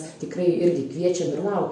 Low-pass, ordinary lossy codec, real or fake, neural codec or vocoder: 10.8 kHz; MP3, 64 kbps; fake; vocoder, 44.1 kHz, 128 mel bands every 256 samples, BigVGAN v2